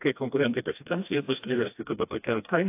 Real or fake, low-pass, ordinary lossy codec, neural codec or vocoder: fake; 3.6 kHz; AAC, 24 kbps; codec, 16 kHz, 1 kbps, FreqCodec, smaller model